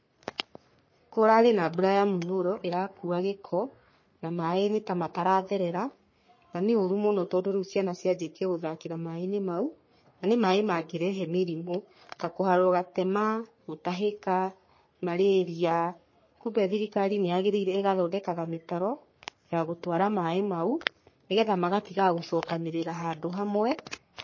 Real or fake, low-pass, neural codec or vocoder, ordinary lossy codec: fake; 7.2 kHz; codec, 44.1 kHz, 3.4 kbps, Pupu-Codec; MP3, 32 kbps